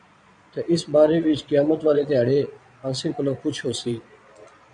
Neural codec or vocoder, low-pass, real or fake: vocoder, 22.05 kHz, 80 mel bands, Vocos; 9.9 kHz; fake